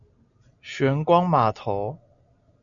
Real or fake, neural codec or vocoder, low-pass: real; none; 7.2 kHz